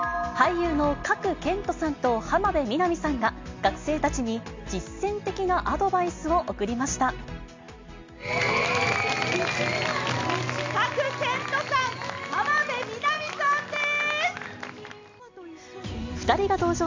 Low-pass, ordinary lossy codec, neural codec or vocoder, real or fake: 7.2 kHz; MP3, 64 kbps; none; real